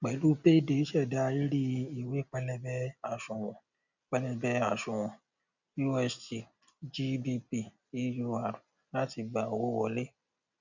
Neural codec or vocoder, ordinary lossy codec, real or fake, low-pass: none; none; real; 7.2 kHz